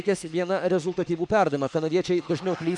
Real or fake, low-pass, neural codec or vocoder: fake; 10.8 kHz; autoencoder, 48 kHz, 32 numbers a frame, DAC-VAE, trained on Japanese speech